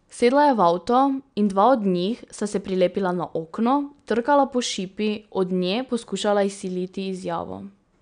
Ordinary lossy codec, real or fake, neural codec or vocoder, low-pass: none; real; none; 9.9 kHz